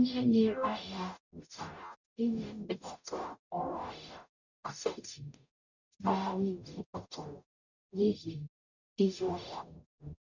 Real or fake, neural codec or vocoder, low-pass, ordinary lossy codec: fake; codec, 44.1 kHz, 0.9 kbps, DAC; 7.2 kHz; none